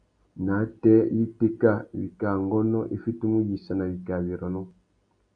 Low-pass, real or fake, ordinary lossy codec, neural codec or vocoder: 9.9 kHz; real; AAC, 48 kbps; none